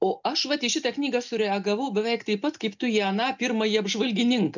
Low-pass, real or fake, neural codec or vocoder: 7.2 kHz; real; none